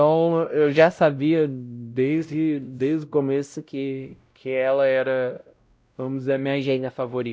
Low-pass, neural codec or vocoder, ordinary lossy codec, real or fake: none; codec, 16 kHz, 0.5 kbps, X-Codec, WavLM features, trained on Multilingual LibriSpeech; none; fake